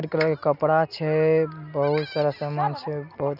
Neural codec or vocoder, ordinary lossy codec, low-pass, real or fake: none; none; 5.4 kHz; real